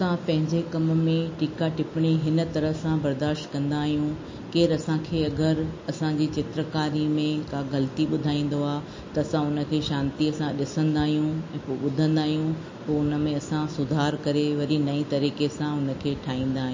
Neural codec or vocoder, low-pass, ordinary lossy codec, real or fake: none; 7.2 kHz; MP3, 32 kbps; real